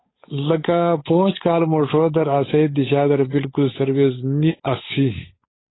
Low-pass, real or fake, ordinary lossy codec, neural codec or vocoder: 7.2 kHz; fake; AAC, 16 kbps; codec, 16 kHz, 8 kbps, FunCodec, trained on Chinese and English, 25 frames a second